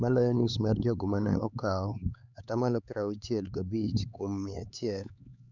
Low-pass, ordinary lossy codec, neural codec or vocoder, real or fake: 7.2 kHz; none; codec, 16 kHz, 4 kbps, X-Codec, HuBERT features, trained on LibriSpeech; fake